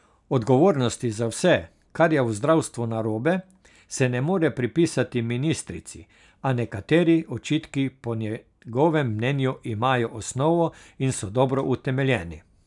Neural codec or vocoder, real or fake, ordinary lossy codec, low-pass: none; real; none; 10.8 kHz